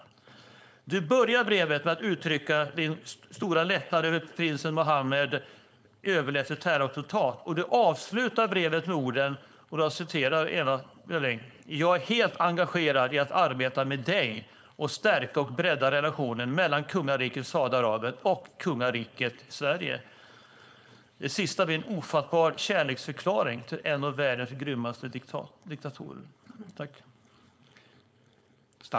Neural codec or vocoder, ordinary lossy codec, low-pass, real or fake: codec, 16 kHz, 4.8 kbps, FACodec; none; none; fake